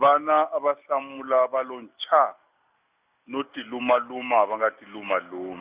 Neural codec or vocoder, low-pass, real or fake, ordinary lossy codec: none; 3.6 kHz; real; Opus, 64 kbps